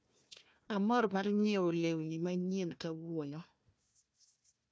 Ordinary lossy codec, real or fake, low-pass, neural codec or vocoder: none; fake; none; codec, 16 kHz, 1 kbps, FunCodec, trained on Chinese and English, 50 frames a second